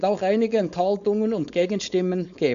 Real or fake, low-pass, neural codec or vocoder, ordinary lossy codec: fake; 7.2 kHz; codec, 16 kHz, 4.8 kbps, FACodec; none